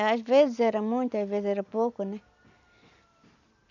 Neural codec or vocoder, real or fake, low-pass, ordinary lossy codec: none; real; 7.2 kHz; none